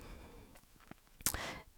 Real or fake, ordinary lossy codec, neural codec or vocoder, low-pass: fake; none; autoencoder, 48 kHz, 128 numbers a frame, DAC-VAE, trained on Japanese speech; none